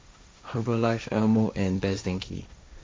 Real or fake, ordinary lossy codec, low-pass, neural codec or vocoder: fake; none; none; codec, 16 kHz, 1.1 kbps, Voila-Tokenizer